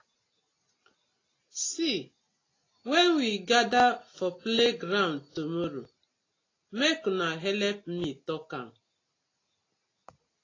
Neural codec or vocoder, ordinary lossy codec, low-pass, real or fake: none; AAC, 32 kbps; 7.2 kHz; real